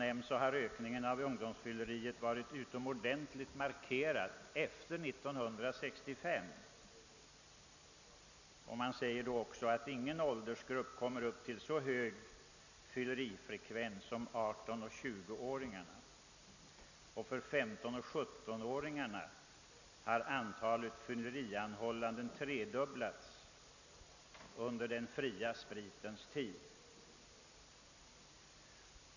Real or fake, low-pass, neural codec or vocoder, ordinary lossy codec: real; 7.2 kHz; none; none